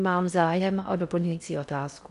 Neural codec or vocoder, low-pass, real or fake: codec, 16 kHz in and 24 kHz out, 0.6 kbps, FocalCodec, streaming, 4096 codes; 10.8 kHz; fake